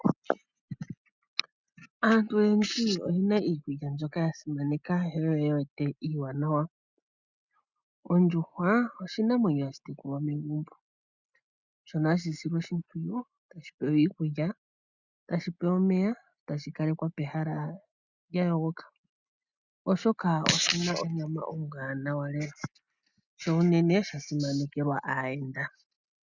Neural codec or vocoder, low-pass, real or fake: none; 7.2 kHz; real